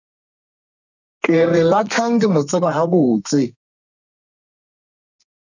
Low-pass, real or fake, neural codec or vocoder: 7.2 kHz; fake; codec, 32 kHz, 1.9 kbps, SNAC